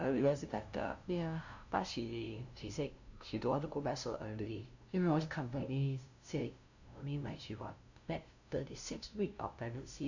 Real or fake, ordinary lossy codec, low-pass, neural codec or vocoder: fake; none; 7.2 kHz; codec, 16 kHz, 0.5 kbps, FunCodec, trained on LibriTTS, 25 frames a second